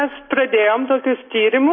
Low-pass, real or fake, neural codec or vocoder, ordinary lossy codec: 7.2 kHz; real; none; MP3, 24 kbps